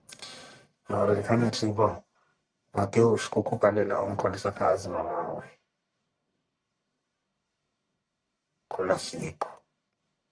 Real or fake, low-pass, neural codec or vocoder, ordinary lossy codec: fake; 9.9 kHz; codec, 44.1 kHz, 1.7 kbps, Pupu-Codec; MP3, 96 kbps